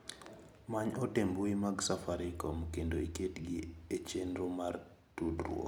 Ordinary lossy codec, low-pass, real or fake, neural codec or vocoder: none; none; real; none